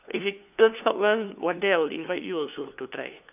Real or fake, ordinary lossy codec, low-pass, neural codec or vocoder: fake; none; 3.6 kHz; codec, 16 kHz, 2 kbps, FunCodec, trained on LibriTTS, 25 frames a second